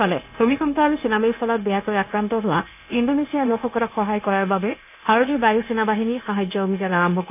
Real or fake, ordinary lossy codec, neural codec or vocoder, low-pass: fake; AAC, 32 kbps; codec, 16 kHz, 0.9 kbps, LongCat-Audio-Codec; 3.6 kHz